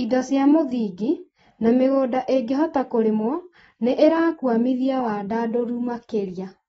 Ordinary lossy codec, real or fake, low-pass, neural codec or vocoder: AAC, 24 kbps; real; 19.8 kHz; none